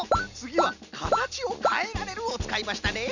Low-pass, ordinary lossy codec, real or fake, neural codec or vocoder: 7.2 kHz; none; real; none